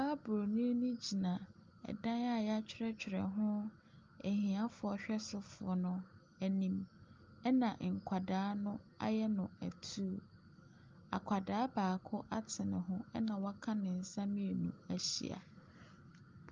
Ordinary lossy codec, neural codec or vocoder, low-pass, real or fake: Opus, 32 kbps; none; 7.2 kHz; real